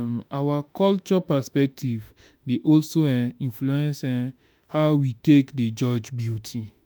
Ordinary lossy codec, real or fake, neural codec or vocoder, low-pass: none; fake; autoencoder, 48 kHz, 32 numbers a frame, DAC-VAE, trained on Japanese speech; none